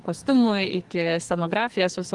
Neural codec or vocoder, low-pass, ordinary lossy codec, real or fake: codec, 44.1 kHz, 2.6 kbps, SNAC; 10.8 kHz; Opus, 24 kbps; fake